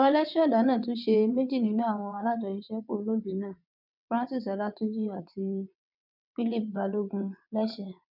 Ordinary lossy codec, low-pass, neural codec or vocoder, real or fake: none; 5.4 kHz; vocoder, 22.05 kHz, 80 mel bands, WaveNeXt; fake